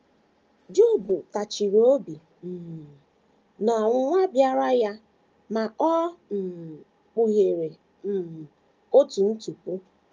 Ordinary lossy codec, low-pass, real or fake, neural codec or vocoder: Opus, 24 kbps; 7.2 kHz; real; none